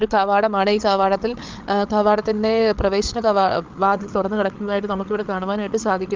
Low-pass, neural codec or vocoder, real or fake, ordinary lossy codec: 7.2 kHz; codec, 16 kHz, 8 kbps, FreqCodec, larger model; fake; Opus, 32 kbps